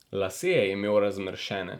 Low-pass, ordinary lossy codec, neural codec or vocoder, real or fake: 19.8 kHz; none; vocoder, 44.1 kHz, 128 mel bands every 512 samples, BigVGAN v2; fake